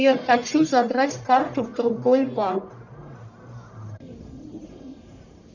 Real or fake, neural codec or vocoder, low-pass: fake; codec, 44.1 kHz, 1.7 kbps, Pupu-Codec; 7.2 kHz